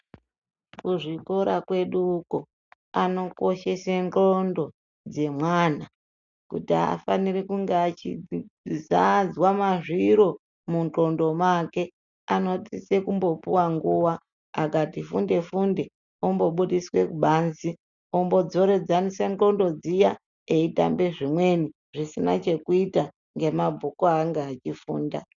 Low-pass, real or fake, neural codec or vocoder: 7.2 kHz; real; none